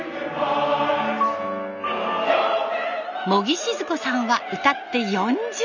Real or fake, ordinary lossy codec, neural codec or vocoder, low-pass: real; none; none; 7.2 kHz